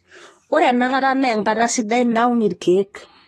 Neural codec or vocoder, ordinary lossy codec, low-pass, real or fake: codec, 32 kHz, 1.9 kbps, SNAC; AAC, 32 kbps; 14.4 kHz; fake